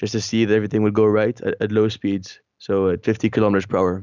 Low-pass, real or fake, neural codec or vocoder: 7.2 kHz; real; none